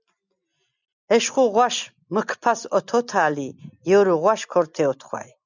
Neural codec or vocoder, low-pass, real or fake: none; 7.2 kHz; real